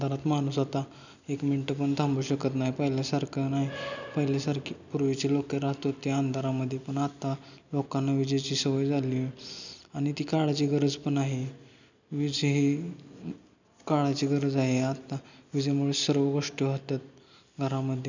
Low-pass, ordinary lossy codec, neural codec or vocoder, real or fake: 7.2 kHz; none; none; real